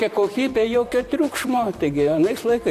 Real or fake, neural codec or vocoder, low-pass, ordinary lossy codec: fake; vocoder, 44.1 kHz, 128 mel bands, Pupu-Vocoder; 14.4 kHz; AAC, 64 kbps